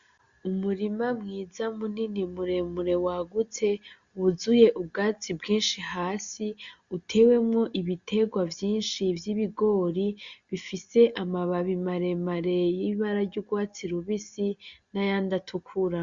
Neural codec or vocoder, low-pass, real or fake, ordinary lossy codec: none; 7.2 kHz; real; Opus, 64 kbps